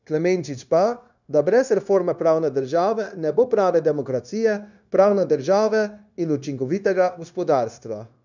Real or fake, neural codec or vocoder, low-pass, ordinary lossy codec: fake; codec, 16 kHz, 0.9 kbps, LongCat-Audio-Codec; 7.2 kHz; none